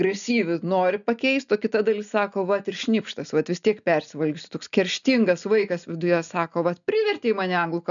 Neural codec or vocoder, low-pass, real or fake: none; 7.2 kHz; real